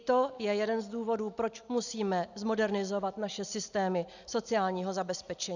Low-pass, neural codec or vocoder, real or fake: 7.2 kHz; none; real